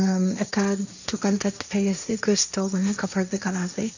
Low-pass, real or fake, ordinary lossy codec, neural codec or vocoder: 7.2 kHz; fake; none; codec, 16 kHz, 1.1 kbps, Voila-Tokenizer